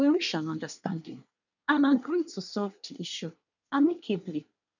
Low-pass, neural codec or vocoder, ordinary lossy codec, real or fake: 7.2 kHz; codec, 24 kHz, 1 kbps, SNAC; none; fake